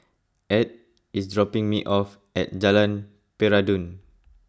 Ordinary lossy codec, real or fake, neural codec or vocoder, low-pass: none; real; none; none